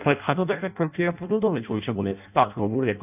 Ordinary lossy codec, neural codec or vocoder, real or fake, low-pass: none; codec, 16 kHz in and 24 kHz out, 0.6 kbps, FireRedTTS-2 codec; fake; 3.6 kHz